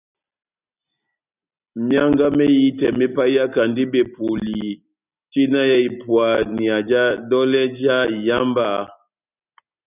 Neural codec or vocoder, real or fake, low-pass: none; real; 3.6 kHz